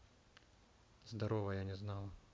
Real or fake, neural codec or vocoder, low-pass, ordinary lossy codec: real; none; none; none